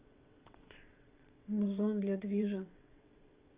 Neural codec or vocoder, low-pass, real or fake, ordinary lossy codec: vocoder, 22.05 kHz, 80 mel bands, WaveNeXt; 3.6 kHz; fake; Opus, 64 kbps